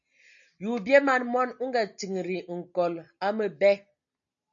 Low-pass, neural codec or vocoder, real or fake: 7.2 kHz; none; real